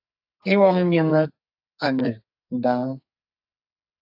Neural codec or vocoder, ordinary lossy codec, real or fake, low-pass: codec, 44.1 kHz, 2.6 kbps, SNAC; AAC, 48 kbps; fake; 5.4 kHz